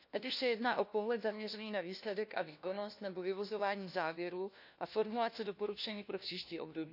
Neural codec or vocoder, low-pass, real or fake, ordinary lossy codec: codec, 16 kHz, 1 kbps, FunCodec, trained on LibriTTS, 50 frames a second; 5.4 kHz; fake; none